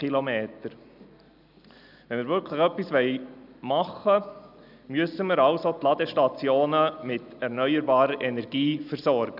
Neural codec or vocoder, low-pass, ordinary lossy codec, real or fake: none; 5.4 kHz; none; real